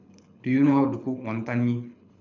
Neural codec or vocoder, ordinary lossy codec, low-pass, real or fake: codec, 24 kHz, 6 kbps, HILCodec; MP3, 64 kbps; 7.2 kHz; fake